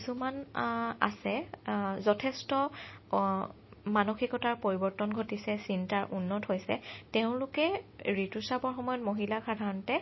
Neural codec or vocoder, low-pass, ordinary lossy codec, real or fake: none; 7.2 kHz; MP3, 24 kbps; real